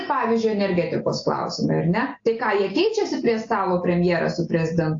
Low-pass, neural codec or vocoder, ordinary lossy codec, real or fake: 7.2 kHz; none; AAC, 48 kbps; real